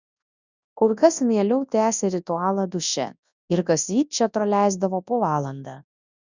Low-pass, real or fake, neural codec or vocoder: 7.2 kHz; fake; codec, 24 kHz, 0.9 kbps, WavTokenizer, large speech release